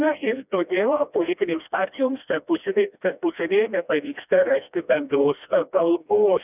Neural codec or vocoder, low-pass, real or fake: codec, 16 kHz, 1 kbps, FreqCodec, smaller model; 3.6 kHz; fake